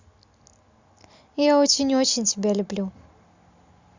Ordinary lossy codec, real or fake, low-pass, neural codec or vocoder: Opus, 64 kbps; real; 7.2 kHz; none